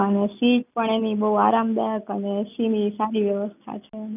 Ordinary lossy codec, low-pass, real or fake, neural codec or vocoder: none; 3.6 kHz; real; none